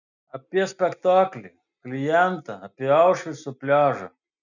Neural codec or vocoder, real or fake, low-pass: none; real; 7.2 kHz